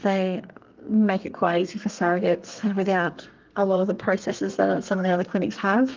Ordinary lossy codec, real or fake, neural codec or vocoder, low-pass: Opus, 24 kbps; fake; codec, 32 kHz, 1.9 kbps, SNAC; 7.2 kHz